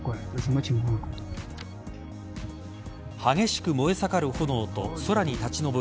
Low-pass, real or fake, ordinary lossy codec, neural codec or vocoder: none; real; none; none